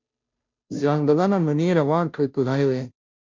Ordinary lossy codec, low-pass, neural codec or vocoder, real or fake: MP3, 48 kbps; 7.2 kHz; codec, 16 kHz, 0.5 kbps, FunCodec, trained on Chinese and English, 25 frames a second; fake